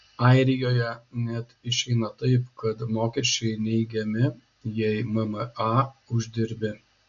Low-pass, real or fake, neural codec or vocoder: 7.2 kHz; real; none